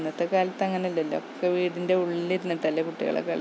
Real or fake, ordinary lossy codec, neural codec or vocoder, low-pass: real; none; none; none